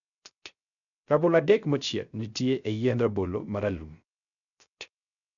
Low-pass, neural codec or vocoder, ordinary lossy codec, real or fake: 7.2 kHz; codec, 16 kHz, 0.3 kbps, FocalCodec; none; fake